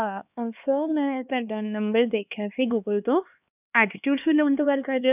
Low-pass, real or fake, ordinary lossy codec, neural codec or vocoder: 3.6 kHz; fake; none; codec, 16 kHz, 2 kbps, X-Codec, HuBERT features, trained on LibriSpeech